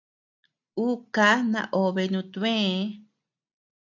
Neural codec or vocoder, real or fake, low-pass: none; real; 7.2 kHz